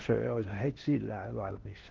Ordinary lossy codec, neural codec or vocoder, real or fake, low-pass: Opus, 16 kbps; codec, 16 kHz in and 24 kHz out, 0.6 kbps, FocalCodec, streaming, 4096 codes; fake; 7.2 kHz